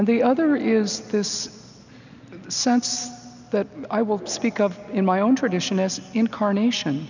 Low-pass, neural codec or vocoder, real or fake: 7.2 kHz; none; real